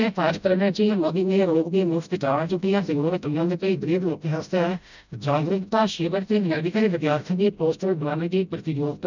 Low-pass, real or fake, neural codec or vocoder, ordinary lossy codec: 7.2 kHz; fake; codec, 16 kHz, 0.5 kbps, FreqCodec, smaller model; none